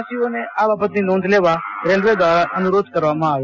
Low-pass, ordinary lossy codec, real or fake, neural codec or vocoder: 7.2 kHz; none; real; none